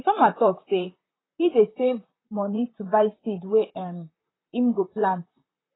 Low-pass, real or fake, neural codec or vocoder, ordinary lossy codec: 7.2 kHz; fake; vocoder, 44.1 kHz, 128 mel bands, Pupu-Vocoder; AAC, 16 kbps